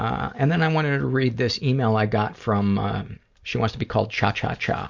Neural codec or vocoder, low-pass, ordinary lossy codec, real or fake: none; 7.2 kHz; Opus, 64 kbps; real